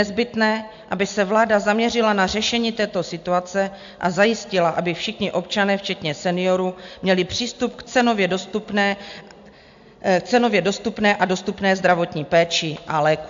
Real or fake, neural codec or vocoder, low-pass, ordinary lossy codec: real; none; 7.2 kHz; AAC, 64 kbps